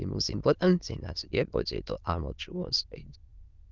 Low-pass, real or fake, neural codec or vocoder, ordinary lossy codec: 7.2 kHz; fake; autoencoder, 22.05 kHz, a latent of 192 numbers a frame, VITS, trained on many speakers; Opus, 24 kbps